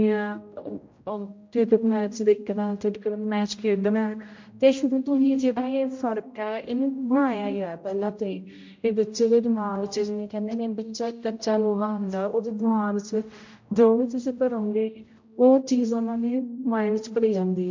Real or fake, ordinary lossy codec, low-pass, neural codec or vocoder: fake; MP3, 48 kbps; 7.2 kHz; codec, 16 kHz, 0.5 kbps, X-Codec, HuBERT features, trained on general audio